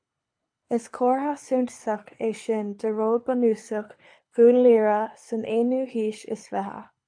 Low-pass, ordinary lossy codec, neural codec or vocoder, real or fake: 9.9 kHz; AAC, 64 kbps; codec, 24 kHz, 6 kbps, HILCodec; fake